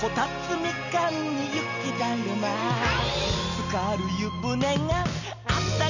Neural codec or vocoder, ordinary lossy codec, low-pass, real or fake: none; none; 7.2 kHz; real